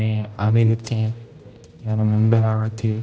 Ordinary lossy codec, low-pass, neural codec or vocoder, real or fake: none; none; codec, 16 kHz, 0.5 kbps, X-Codec, HuBERT features, trained on general audio; fake